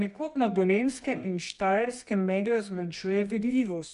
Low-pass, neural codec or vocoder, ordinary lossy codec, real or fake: 10.8 kHz; codec, 24 kHz, 0.9 kbps, WavTokenizer, medium music audio release; none; fake